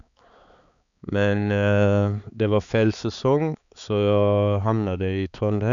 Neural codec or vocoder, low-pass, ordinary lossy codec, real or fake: codec, 16 kHz, 4 kbps, X-Codec, HuBERT features, trained on balanced general audio; 7.2 kHz; none; fake